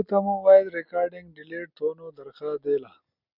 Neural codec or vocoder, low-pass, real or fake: none; 5.4 kHz; real